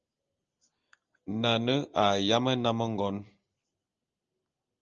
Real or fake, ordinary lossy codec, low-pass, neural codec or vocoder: real; Opus, 24 kbps; 7.2 kHz; none